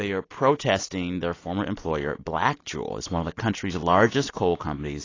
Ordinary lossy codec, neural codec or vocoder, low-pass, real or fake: AAC, 32 kbps; none; 7.2 kHz; real